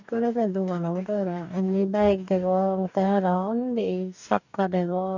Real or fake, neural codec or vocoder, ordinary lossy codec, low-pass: fake; codec, 44.1 kHz, 2.6 kbps, DAC; none; 7.2 kHz